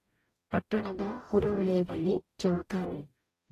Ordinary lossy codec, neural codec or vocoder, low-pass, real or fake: AAC, 48 kbps; codec, 44.1 kHz, 0.9 kbps, DAC; 14.4 kHz; fake